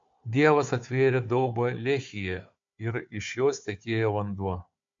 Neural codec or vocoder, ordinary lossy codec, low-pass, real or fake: codec, 16 kHz, 4 kbps, FunCodec, trained on Chinese and English, 50 frames a second; MP3, 48 kbps; 7.2 kHz; fake